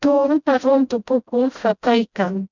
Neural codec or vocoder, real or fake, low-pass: codec, 16 kHz, 0.5 kbps, FreqCodec, smaller model; fake; 7.2 kHz